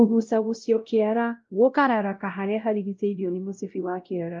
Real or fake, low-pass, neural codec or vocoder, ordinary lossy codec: fake; 7.2 kHz; codec, 16 kHz, 0.5 kbps, X-Codec, WavLM features, trained on Multilingual LibriSpeech; Opus, 32 kbps